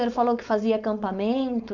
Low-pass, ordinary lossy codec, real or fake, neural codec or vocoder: 7.2 kHz; MP3, 64 kbps; fake; codec, 16 kHz, 4.8 kbps, FACodec